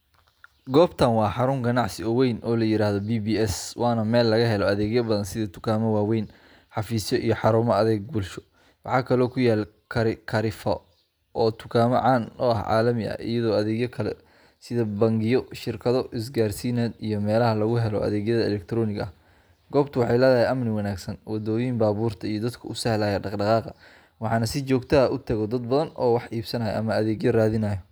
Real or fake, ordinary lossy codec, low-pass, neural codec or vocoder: real; none; none; none